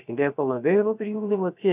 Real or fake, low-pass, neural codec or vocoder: fake; 3.6 kHz; codec, 16 kHz, 0.3 kbps, FocalCodec